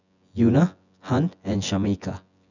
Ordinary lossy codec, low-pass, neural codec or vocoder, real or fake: none; 7.2 kHz; vocoder, 24 kHz, 100 mel bands, Vocos; fake